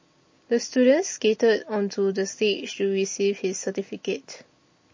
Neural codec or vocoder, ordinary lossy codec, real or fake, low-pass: none; MP3, 32 kbps; real; 7.2 kHz